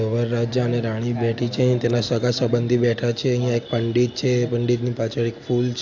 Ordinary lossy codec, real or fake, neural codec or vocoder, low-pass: AAC, 48 kbps; real; none; 7.2 kHz